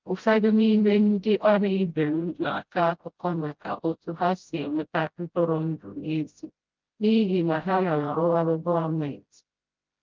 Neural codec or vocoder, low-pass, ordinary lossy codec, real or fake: codec, 16 kHz, 0.5 kbps, FreqCodec, smaller model; 7.2 kHz; Opus, 32 kbps; fake